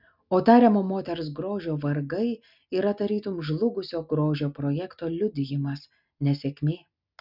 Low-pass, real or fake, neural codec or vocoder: 5.4 kHz; real; none